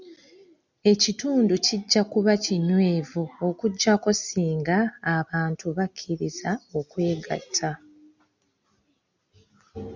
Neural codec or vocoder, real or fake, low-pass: none; real; 7.2 kHz